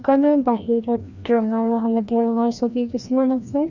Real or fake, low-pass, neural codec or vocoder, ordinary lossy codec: fake; 7.2 kHz; codec, 16 kHz, 1 kbps, FreqCodec, larger model; none